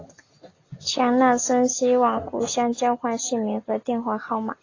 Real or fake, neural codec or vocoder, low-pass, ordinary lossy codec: real; none; 7.2 kHz; AAC, 32 kbps